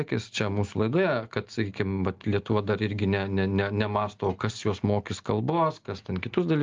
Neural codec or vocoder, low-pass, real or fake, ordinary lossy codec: none; 7.2 kHz; real; Opus, 32 kbps